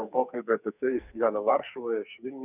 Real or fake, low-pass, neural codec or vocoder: fake; 3.6 kHz; codec, 44.1 kHz, 2.6 kbps, SNAC